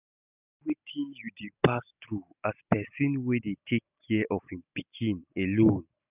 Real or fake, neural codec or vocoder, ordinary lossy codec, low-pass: real; none; none; 3.6 kHz